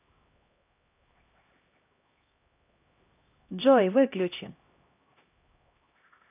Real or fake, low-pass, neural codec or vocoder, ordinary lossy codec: fake; 3.6 kHz; codec, 16 kHz, 1 kbps, X-Codec, HuBERT features, trained on LibriSpeech; none